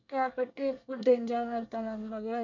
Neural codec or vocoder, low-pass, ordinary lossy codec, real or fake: codec, 24 kHz, 1 kbps, SNAC; 7.2 kHz; none; fake